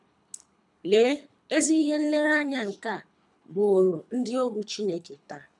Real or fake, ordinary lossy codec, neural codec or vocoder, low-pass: fake; none; codec, 24 kHz, 3 kbps, HILCodec; 10.8 kHz